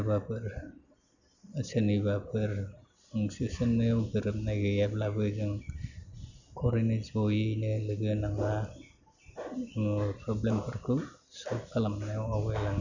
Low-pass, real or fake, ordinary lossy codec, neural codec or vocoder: 7.2 kHz; real; none; none